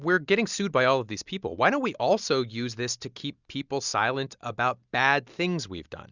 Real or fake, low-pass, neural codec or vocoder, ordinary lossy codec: real; 7.2 kHz; none; Opus, 64 kbps